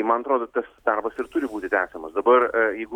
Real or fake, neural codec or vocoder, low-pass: real; none; 19.8 kHz